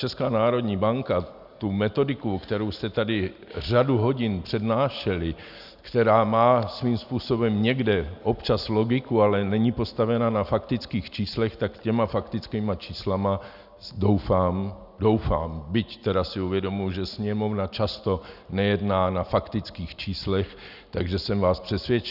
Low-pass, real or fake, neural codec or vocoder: 5.4 kHz; real; none